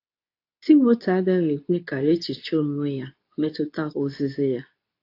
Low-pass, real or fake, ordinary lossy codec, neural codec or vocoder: 5.4 kHz; fake; AAC, 32 kbps; codec, 24 kHz, 0.9 kbps, WavTokenizer, medium speech release version 2